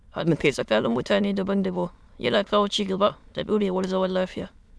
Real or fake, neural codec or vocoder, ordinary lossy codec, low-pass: fake; autoencoder, 22.05 kHz, a latent of 192 numbers a frame, VITS, trained on many speakers; none; none